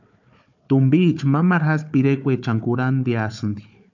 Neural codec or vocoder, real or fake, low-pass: codec, 16 kHz, 4 kbps, FunCodec, trained on Chinese and English, 50 frames a second; fake; 7.2 kHz